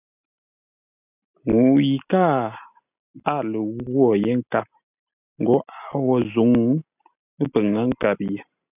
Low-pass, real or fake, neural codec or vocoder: 3.6 kHz; real; none